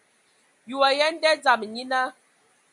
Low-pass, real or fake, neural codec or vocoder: 10.8 kHz; real; none